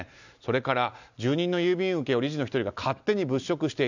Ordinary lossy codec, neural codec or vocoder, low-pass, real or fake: none; none; 7.2 kHz; real